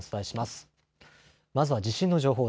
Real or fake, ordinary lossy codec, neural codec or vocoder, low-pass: real; none; none; none